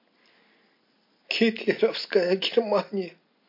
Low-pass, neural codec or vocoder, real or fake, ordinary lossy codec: 5.4 kHz; none; real; MP3, 32 kbps